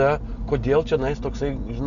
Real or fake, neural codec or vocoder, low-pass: real; none; 7.2 kHz